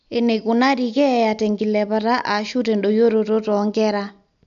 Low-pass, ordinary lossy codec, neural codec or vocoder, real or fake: 7.2 kHz; none; none; real